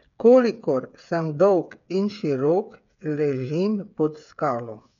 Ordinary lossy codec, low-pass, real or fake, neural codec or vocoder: none; 7.2 kHz; fake; codec, 16 kHz, 8 kbps, FreqCodec, smaller model